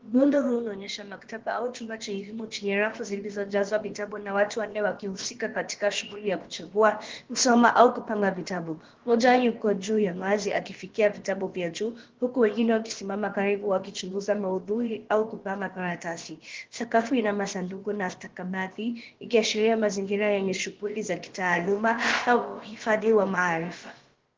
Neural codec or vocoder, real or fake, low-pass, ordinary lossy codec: codec, 16 kHz, about 1 kbps, DyCAST, with the encoder's durations; fake; 7.2 kHz; Opus, 16 kbps